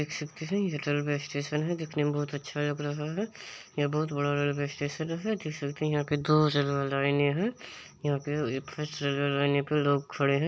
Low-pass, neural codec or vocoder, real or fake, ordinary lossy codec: none; none; real; none